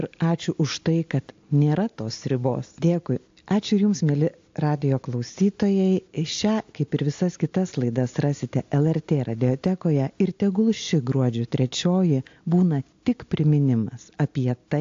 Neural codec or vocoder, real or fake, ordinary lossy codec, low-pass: none; real; AAC, 48 kbps; 7.2 kHz